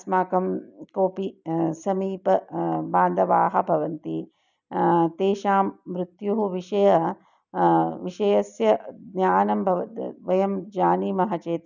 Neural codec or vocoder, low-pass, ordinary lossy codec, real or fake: none; 7.2 kHz; none; real